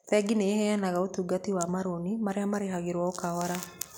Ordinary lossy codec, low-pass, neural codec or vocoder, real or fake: none; none; none; real